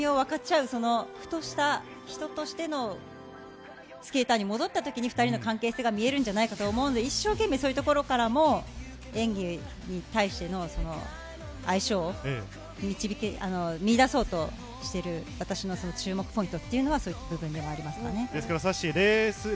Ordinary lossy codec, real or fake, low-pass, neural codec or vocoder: none; real; none; none